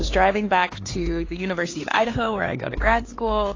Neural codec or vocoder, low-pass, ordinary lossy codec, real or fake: codec, 16 kHz, 4 kbps, X-Codec, HuBERT features, trained on LibriSpeech; 7.2 kHz; AAC, 32 kbps; fake